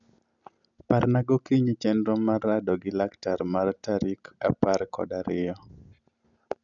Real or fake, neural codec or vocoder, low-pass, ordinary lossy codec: real; none; 7.2 kHz; MP3, 96 kbps